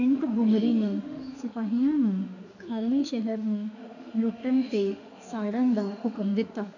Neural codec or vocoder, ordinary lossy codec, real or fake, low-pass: autoencoder, 48 kHz, 32 numbers a frame, DAC-VAE, trained on Japanese speech; none; fake; 7.2 kHz